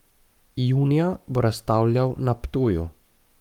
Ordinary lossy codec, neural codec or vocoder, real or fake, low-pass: Opus, 32 kbps; codec, 44.1 kHz, 7.8 kbps, Pupu-Codec; fake; 19.8 kHz